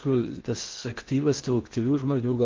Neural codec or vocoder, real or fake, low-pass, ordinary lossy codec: codec, 16 kHz in and 24 kHz out, 0.6 kbps, FocalCodec, streaming, 4096 codes; fake; 7.2 kHz; Opus, 32 kbps